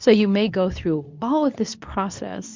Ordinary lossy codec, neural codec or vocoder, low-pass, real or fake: MP3, 64 kbps; codec, 24 kHz, 0.9 kbps, WavTokenizer, medium speech release version 2; 7.2 kHz; fake